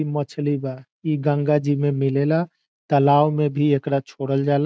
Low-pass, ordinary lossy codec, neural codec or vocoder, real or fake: none; none; none; real